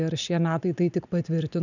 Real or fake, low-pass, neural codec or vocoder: real; 7.2 kHz; none